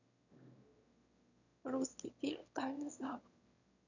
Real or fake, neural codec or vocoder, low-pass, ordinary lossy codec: fake; autoencoder, 22.05 kHz, a latent of 192 numbers a frame, VITS, trained on one speaker; 7.2 kHz; none